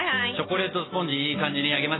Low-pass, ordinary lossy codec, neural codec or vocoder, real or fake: 7.2 kHz; AAC, 16 kbps; none; real